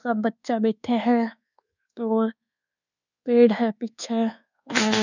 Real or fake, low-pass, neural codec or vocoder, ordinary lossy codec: fake; 7.2 kHz; codec, 24 kHz, 1.2 kbps, DualCodec; none